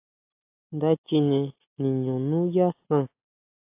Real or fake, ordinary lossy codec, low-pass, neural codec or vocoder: real; AAC, 32 kbps; 3.6 kHz; none